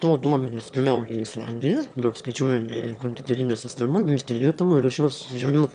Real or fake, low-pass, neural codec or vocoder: fake; 9.9 kHz; autoencoder, 22.05 kHz, a latent of 192 numbers a frame, VITS, trained on one speaker